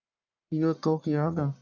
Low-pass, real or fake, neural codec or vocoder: 7.2 kHz; fake; codec, 44.1 kHz, 1.7 kbps, Pupu-Codec